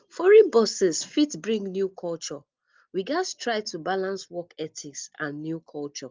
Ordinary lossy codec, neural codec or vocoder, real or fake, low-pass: Opus, 32 kbps; none; real; 7.2 kHz